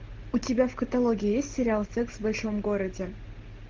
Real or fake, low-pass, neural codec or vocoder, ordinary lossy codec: real; 7.2 kHz; none; Opus, 16 kbps